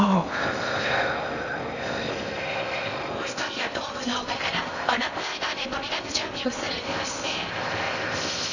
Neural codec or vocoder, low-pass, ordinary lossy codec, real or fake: codec, 16 kHz in and 24 kHz out, 0.6 kbps, FocalCodec, streaming, 4096 codes; 7.2 kHz; none; fake